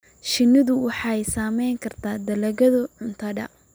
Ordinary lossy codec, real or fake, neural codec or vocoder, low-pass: none; real; none; none